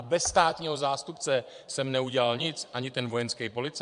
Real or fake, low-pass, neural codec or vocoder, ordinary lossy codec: fake; 9.9 kHz; vocoder, 22.05 kHz, 80 mel bands, Vocos; MP3, 64 kbps